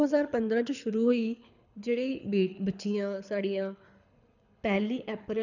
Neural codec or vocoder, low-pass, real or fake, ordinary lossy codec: codec, 24 kHz, 6 kbps, HILCodec; 7.2 kHz; fake; none